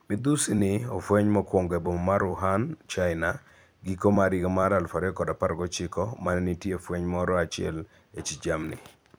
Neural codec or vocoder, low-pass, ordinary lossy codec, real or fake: vocoder, 44.1 kHz, 128 mel bands every 256 samples, BigVGAN v2; none; none; fake